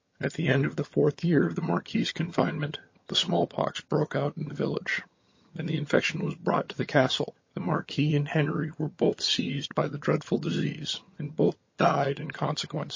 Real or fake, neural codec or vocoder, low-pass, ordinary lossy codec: fake; vocoder, 22.05 kHz, 80 mel bands, HiFi-GAN; 7.2 kHz; MP3, 32 kbps